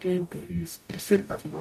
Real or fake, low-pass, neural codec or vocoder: fake; 14.4 kHz; codec, 44.1 kHz, 0.9 kbps, DAC